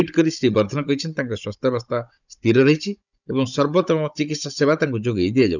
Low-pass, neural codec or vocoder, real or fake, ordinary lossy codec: 7.2 kHz; codec, 16 kHz, 16 kbps, FunCodec, trained on Chinese and English, 50 frames a second; fake; none